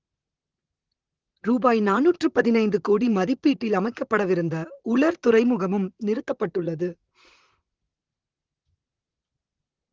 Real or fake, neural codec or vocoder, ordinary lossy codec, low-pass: fake; vocoder, 44.1 kHz, 128 mel bands, Pupu-Vocoder; Opus, 16 kbps; 7.2 kHz